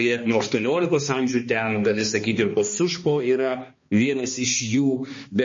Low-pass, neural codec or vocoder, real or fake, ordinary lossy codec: 7.2 kHz; codec, 16 kHz, 2 kbps, X-Codec, HuBERT features, trained on balanced general audio; fake; MP3, 32 kbps